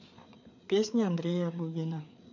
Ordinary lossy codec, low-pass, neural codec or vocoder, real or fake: none; 7.2 kHz; codec, 16 kHz, 4 kbps, FreqCodec, larger model; fake